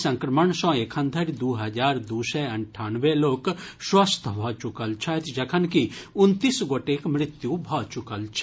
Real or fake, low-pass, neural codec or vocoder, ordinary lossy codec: real; none; none; none